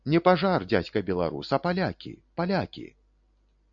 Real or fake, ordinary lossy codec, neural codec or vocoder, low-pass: real; MP3, 96 kbps; none; 7.2 kHz